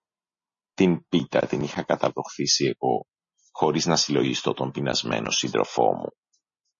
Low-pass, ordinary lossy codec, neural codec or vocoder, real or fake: 7.2 kHz; MP3, 32 kbps; none; real